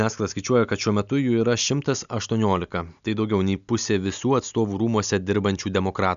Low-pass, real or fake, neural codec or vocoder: 7.2 kHz; real; none